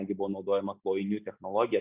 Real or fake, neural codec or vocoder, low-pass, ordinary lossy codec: real; none; 3.6 kHz; Opus, 64 kbps